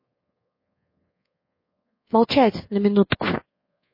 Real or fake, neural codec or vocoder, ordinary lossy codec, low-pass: fake; codec, 24 kHz, 1.2 kbps, DualCodec; MP3, 24 kbps; 5.4 kHz